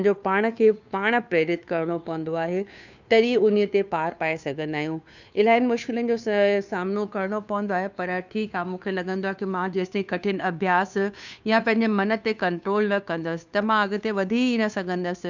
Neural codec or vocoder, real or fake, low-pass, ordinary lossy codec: codec, 16 kHz, 2 kbps, FunCodec, trained on Chinese and English, 25 frames a second; fake; 7.2 kHz; none